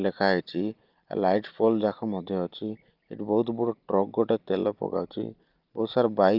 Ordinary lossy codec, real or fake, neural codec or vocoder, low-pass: Opus, 32 kbps; real; none; 5.4 kHz